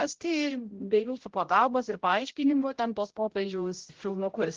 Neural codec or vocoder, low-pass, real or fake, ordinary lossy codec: codec, 16 kHz, 0.5 kbps, X-Codec, HuBERT features, trained on general audio; 7.2 kHz; fake; Opus, 16 kbps